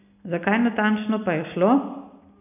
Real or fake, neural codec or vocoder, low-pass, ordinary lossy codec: real; none; 3.6 kHz; none